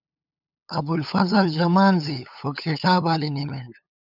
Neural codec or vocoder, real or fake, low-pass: codec, 16 kHz, 8 kbps, FunCodec, trained on LibriTTS, 25 frames a second; fake; 5.4 kHz